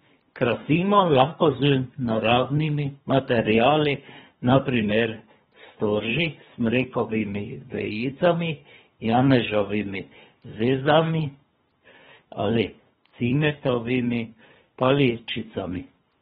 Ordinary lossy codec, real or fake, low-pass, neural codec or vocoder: AAC, 16 kbps; fake; 10.8 kHz; codec, 24 kHz, 3 kbps, HILCodec